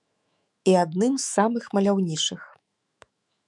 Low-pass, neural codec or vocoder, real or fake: 10.8 kHz; autoencoder, 48 kHz, 128 numbers a frame, DAC-VAE, trained on Japanese speech; fake